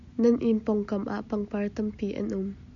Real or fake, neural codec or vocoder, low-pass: real; none; 7.2 kHz